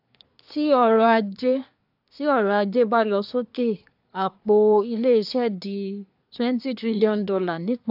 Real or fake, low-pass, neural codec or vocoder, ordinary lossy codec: fake; 5.4 kHz; codec, 24 kHz, 1 kbps, SNAC; AAC, 48 kbps